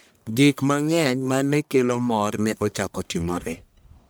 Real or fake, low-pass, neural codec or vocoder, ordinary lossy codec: fake; none; codec, 44.1 kHz, 1.7 kbps, Pupu-Codec; none